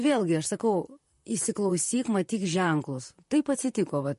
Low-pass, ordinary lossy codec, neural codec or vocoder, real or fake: 14.4 kHz; MP3, 48 kbps; vocoder, 44.1 kHz, 128 mel bands, Pupu-Vocoder; fake